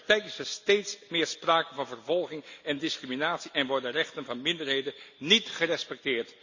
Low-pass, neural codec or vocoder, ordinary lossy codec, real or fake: 7.2 kHz; none; Opus, 64 kbps; real